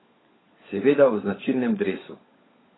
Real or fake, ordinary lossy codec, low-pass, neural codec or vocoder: fake; AAC, 16 kbps; 7.2 kHz; codec, 16 kHz, 16 kbps, FunCodec, trained on LibriTTS, 50 frames a second